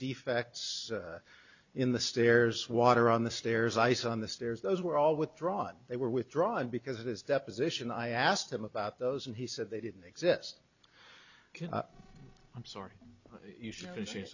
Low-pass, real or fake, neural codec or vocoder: 7.2 kHz; real; none